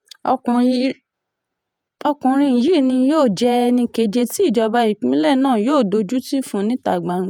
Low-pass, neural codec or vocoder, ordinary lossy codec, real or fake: 19.8 kHz; vocoder, 48 kHz, 128 mel bands, Vocos; none; fake